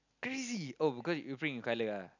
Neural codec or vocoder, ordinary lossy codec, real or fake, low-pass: none; none; real; 7.2 kHz